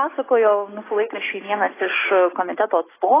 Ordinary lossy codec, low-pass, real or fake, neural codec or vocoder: AAC, 16 kbps; 3.6 kHz; real; none